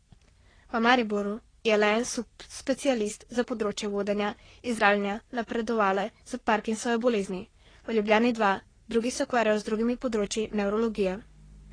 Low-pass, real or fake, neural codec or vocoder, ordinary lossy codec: 9.9 kHz; fake; codec, 44.1 kHz, 3.4 kbps, Pupu-Codec; AAC, 32 kbps